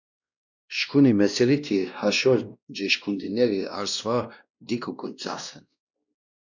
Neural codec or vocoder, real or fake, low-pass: codec, 16 kHz, 1 kbps, X-Codec, WavLM features, trained on Multilingual LibriSpeech; fake; 7.2 kHz